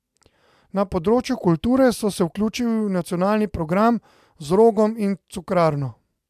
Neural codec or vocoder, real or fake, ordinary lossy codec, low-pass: none; real; AAC, 96 kbps; 14.4 kHz